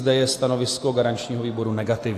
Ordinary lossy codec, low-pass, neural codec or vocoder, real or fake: AAC, 64 kbps; 14.4 kHz; none; real